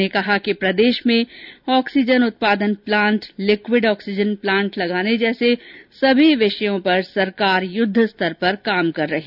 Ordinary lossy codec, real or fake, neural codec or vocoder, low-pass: none; real; none; 5.4 kHz